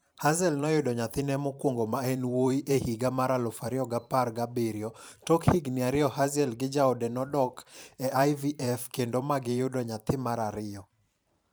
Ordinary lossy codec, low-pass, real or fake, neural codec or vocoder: none; none; fake; vocoder, 44.1 kHz, 128 mel bands every 512 samples, BigVGAN v2